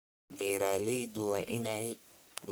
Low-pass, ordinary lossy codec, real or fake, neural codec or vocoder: none; none; fake; codec, 44.1 kHz, 1.7 kbps, Pupu-Codec